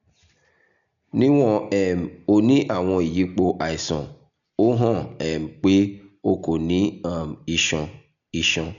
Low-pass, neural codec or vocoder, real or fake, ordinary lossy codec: 7.2 kHz; none; real; none